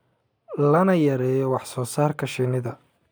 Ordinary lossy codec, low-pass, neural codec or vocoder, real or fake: none; none; none; real